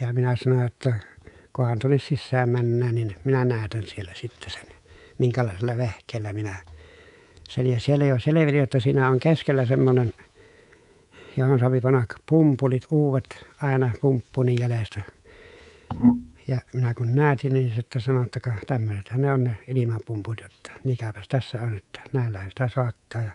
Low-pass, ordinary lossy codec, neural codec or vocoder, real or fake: 10.8 kHz; none; codec, 24 kHz, 3.1 kbps, DualCodec; fake